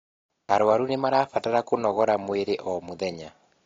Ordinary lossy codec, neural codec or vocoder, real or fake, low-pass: AAC, 32 kbps; none; real; 7.2 kHz